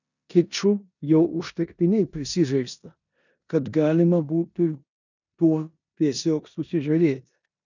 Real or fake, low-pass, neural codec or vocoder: fake; 7.2 kHz; codec, 16 kHz in and 24 kHz out, 0.9 kbps, LongCat-Audio-Codec, four codebook decoder